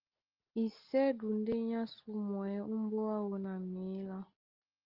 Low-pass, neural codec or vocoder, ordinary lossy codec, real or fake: 5.4 kHz; none; Opus, 16 kbps; real